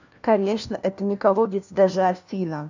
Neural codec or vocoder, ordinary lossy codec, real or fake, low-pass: codec, 16 kHz, 1 kbps, FunCodec, trained on LibriTTS, 50 frames a second; none; fake; 7.2 kHz